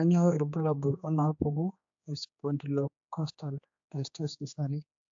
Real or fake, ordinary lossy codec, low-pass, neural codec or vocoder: fake; AAC, 64 kbps; 7.2 kHz; codec, 16 kHz, 2 kbps, X-Codec, HuBERT features, trained on general audio